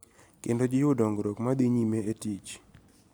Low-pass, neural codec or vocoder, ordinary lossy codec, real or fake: none; none; none; real